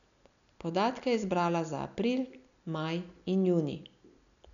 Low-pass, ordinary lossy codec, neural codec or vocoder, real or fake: 7.2 kHz; none; none; real